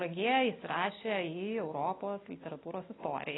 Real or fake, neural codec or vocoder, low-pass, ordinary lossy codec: real; none; 7.2 kHz; AAC, 16 kbps